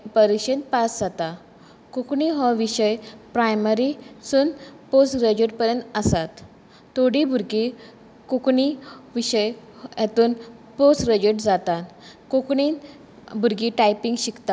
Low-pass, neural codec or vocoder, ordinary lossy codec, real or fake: none; none; none; real